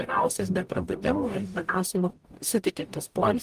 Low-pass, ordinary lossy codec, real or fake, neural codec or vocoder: 14.4 kHz; Opus, 32 kbps; fake; codec, 44.1 kHz, 0.9 kbps, DAC